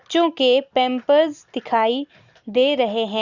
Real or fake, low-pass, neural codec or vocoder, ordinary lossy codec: real; 7.2 kHz; none; Opus, 64 kbps